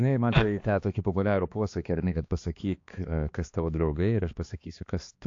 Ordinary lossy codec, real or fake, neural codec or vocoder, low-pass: AAC, 48 kbps; fake; codec, 16 kHz, 2 kbps, X-Codec, HuBERT features, trained on balanced general audio; 7.2 kHz